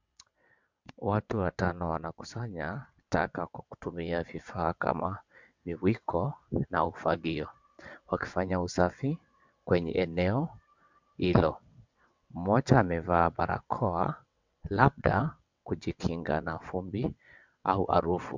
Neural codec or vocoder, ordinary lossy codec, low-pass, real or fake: vocoder, 22.05 kHz, 80 mel bands, WaveNeXt; MP3, 64 kbps; 7.2 kHz; fake